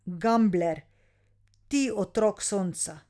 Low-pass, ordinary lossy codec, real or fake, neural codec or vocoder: none; none; real; none